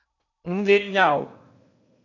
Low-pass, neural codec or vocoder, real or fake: 7.2 kHz; codec, 16 kHz in and 24 kHz out, 0.8 kbps, FocalCodec, streaming, 65536 codes; fake